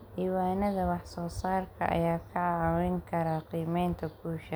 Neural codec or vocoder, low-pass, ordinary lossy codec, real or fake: none; none; none; real